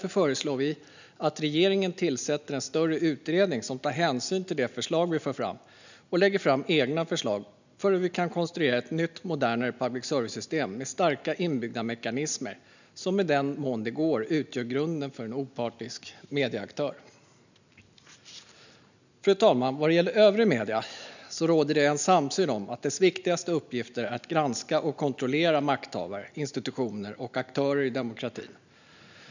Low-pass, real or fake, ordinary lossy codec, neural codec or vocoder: 7.2 kHz; real; none; none